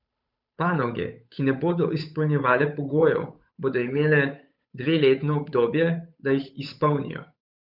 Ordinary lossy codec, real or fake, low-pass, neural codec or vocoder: none; fake; 5.4 kHz; codec, 16 kHz, 8 kbps, FunCodec, trained on Chinese and English, 25 frames a second